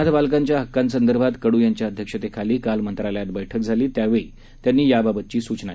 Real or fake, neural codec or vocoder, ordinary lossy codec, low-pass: real; none; none; none